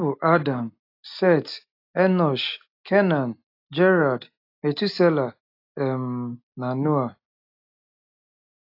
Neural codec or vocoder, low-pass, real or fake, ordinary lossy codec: none; 5.4 kHz; real; AAC, 32 kbps